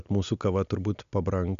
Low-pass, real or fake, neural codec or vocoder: 7.2 kHz; real; none